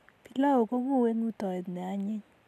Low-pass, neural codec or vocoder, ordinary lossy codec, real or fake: 14.4 kHz; none; MP3, 96 kbps; real